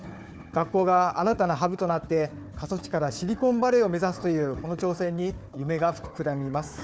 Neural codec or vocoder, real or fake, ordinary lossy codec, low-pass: codec, 16 kHz, 4 kbps, FunCodec, trained on Chinese and English, 50 frames a second; fake; none; none